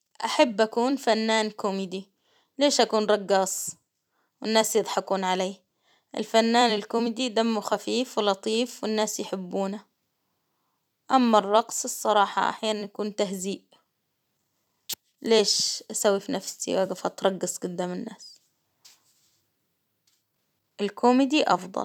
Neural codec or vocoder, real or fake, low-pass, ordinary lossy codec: vocoder, 44.1 kHz, 128 mel bands every 256 samples, BigVGAN v2; fake; 19.8 kHz; none